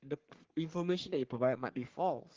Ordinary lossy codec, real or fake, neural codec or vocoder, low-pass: Opus, 16 kbps; fake; codec, 44.1 kHz, 3.4 kbps, Pupu-Codec; 7.2 kHz